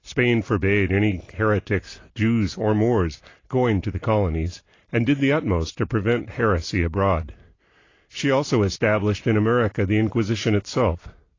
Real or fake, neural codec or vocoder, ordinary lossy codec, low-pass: real; none; AAC, 32 kbps; 7.2 kHz